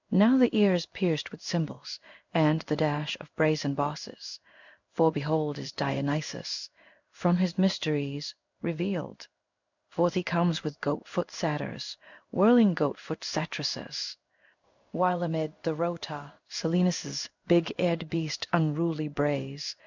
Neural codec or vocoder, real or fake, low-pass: codec, 16 kHz in and 24 kHz out, 1 kbps, XY-Tokenizer; fake; 7.2 kHz